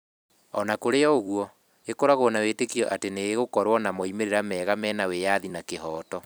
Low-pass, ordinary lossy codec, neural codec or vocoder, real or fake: none; none; vocoder, 44.1 kHz, 128 mel bands every 256 samples, BigVGAN v2; fake